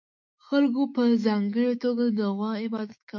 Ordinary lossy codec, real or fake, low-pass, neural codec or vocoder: MP3, 48 kbps; fake; 7.2 kHz; autoencoder, 48 kHz, 128 numbers a frame, DAC-VAE, trained on Japanese speech